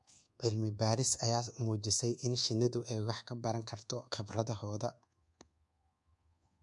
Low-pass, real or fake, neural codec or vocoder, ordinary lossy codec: 10.8 kHz; fake; codec, 24 kHz, 1.2 kbps, DualCodec; MP3, 64 kbps